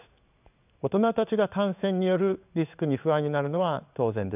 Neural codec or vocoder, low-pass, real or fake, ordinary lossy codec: codec, 16 kHz in and 24 kHz out, 1 kbps, XY-Tokenizer; 3.6 kHz; fake; none